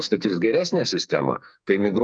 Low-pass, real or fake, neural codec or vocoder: 9.9 kHz; fake; codec, 44.1 kHz, 2.6 kbps, SNAC